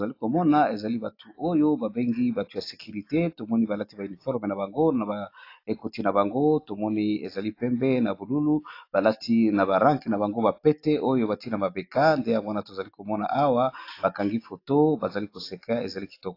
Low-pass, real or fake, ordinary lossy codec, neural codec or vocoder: 5.4 kHz; real; AAC, 32 kbps; none